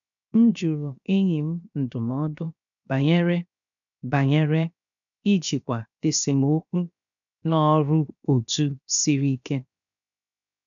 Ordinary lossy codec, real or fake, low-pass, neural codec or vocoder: none; fake; 7.2 kHz; codec, 16 kHz, 0.7 kbps, FocalCodec